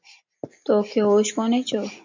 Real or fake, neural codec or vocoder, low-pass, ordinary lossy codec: real; none; 7.2 kHz; MP3, 64 kbps